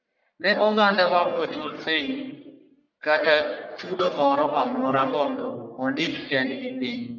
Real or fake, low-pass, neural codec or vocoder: fake; 7.2 kHz; codec, 44.1 kHz, 1.7 kbps, Pupu-Codec